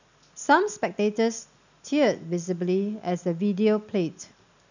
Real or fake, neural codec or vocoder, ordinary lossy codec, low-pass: real; none; none; 7.2 kHz